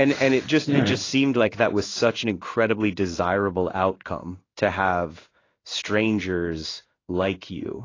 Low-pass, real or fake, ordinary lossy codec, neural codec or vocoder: 7.2 kHz; fake; AAC, 32 kbps; codec, 16 kHz in and 24 kHz out, 1 kbps, XY-Tokenizer